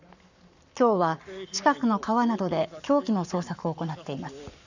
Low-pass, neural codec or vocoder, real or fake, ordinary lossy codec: 7.2 kHz; codec, 44.1 kHz, 7.8 kbps, Pupu-Codec; fake; none